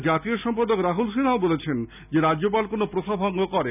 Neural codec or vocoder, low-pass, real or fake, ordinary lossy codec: none; 3.6 kHz; real; none